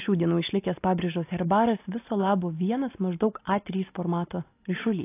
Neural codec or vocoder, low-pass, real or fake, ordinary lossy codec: none; 3.6 kHz; real; AAC, 24 kbps